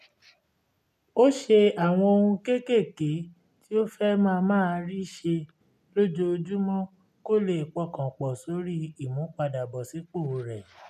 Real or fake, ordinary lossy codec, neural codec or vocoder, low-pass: real; none; none; 14.4 kHz